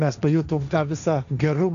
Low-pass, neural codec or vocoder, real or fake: 7.2 kHz; codec, 16 kHz, 1.1 kbps, Voila-Tokenizer; fake